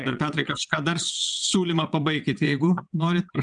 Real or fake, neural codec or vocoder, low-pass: fake; vocoder, 22.05 kHz, 80 mel bands, WaveNeXt; 9.9 kHz